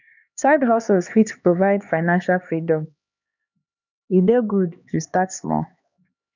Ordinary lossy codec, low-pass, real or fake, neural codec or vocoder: none; 7.2 kHz; fake; codec, 16 kHz, 2 kbps, X-Codec, HuBERT features, trained on LibriSpeech